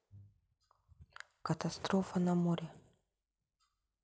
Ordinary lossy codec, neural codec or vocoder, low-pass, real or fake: none; none; none; real